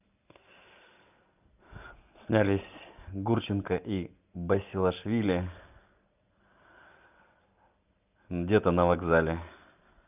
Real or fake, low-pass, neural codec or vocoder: real; 3.6 kHz; none